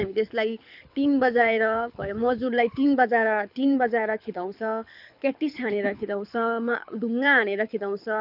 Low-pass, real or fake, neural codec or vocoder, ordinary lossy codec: 5.4 kHz; fake; codec, 24 kHz, 6 kbps, HILCodec; none